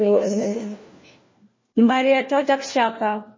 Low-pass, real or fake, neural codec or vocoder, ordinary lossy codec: 7.2 kHz; fake; codec, 16 kHz, 1 kbps, FunCodec, trained on LibriTTS, 50 frames a second; MP3, 32 kbps